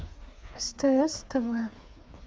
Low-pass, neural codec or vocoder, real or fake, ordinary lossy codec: none; codec, 16 kHz, 4 kbps, FreqCodec, smaller model; fake; none